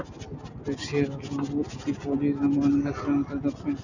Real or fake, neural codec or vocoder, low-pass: real; none; 7.2 kHz